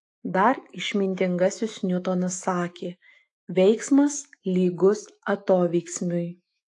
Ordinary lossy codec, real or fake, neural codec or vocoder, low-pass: AAC, 48 kbps; fake; autoencoder, 48 kHz, 128 numbers a frame, DAC-VAE, trained on Japanese speech; 10.8 kHz